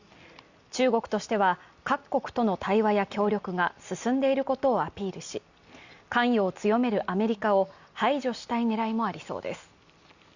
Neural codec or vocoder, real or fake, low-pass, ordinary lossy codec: none; real; 7.2 kHz; Opus, 64 kbps